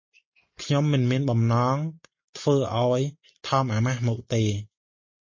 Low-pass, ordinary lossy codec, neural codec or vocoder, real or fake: 7.2 kHz; MP3, 32 kbps; none; real